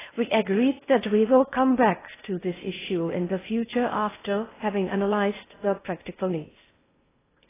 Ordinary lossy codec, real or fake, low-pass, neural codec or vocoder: AAC, 16 kbps; fake; 3.6 kHz; codec, 16 kHz in and 24 kHz out, 0.6 kbps, FocalCodec, streaming, 4096 codes